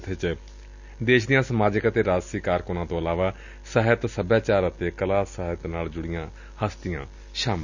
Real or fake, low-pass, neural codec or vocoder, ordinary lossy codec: real; 7.2 kHz; none; none